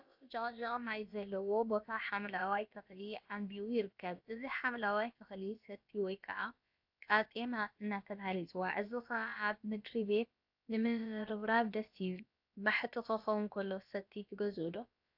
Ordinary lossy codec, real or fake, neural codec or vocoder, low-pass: AAC, 48 kbps; fake; codec, 16 kHz, about 1 kbps, DyCAST, with the encoder's durations; 5.4 kHz